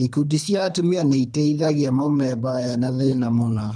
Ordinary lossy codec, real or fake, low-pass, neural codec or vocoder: none; fake; 9.9 kHz; codec, 24 kHz, 3 kbps, HILCodec